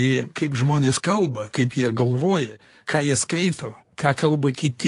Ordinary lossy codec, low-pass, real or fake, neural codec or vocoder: AAC, 48 kbps; 10.8 kHz; fake; codec, 24 kHz, 1 kbps, SNAC